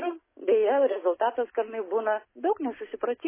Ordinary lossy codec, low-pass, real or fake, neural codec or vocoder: MP3, 16 kbps; 3.6 kHz; real; none